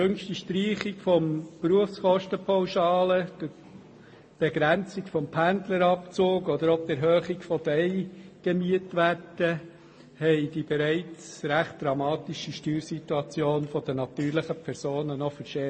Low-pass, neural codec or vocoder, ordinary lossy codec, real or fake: 9.9 kHz; none; MP3, 32 kbps; real